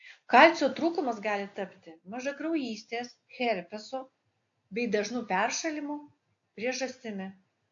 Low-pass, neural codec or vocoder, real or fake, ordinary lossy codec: 7.2 kHz; none; real; AAC, 64 kbps